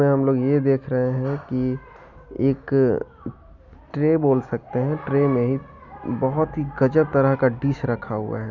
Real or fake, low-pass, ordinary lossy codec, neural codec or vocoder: real; 7.2 kHz; none; none